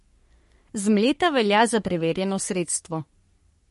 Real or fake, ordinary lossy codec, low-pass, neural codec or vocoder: fake; MP3, 48 kbps; 14.4 kHz; codec, 44.1 kHz, 3.4 kbps, Pupu-Codec